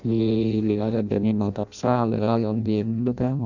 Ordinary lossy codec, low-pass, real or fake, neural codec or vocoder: none; 7.2 kHz; fake; codec, 16 kHz in and 24 kHz out, 0.6 kbps, FireRedTTS-2 codec